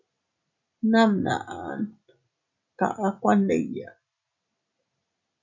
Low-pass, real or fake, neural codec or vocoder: 7.2 kHz; real; none